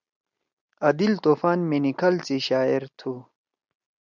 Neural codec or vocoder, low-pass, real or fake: none; 7.2 kHz; real